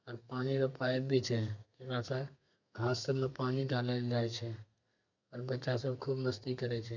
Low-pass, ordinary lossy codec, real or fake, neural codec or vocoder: 7.2 kHz; none; fake; codec, 32 kHz, 1.9 kbps, SNAC